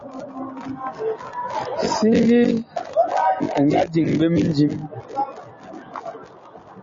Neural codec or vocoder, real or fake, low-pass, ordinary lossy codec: none; real; 7.2 kHz; MP3, 32 kbps